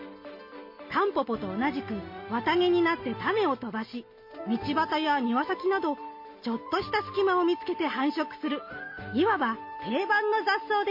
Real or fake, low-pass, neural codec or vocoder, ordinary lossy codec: real; 5.4 kHz; none; MP3, 32 kbps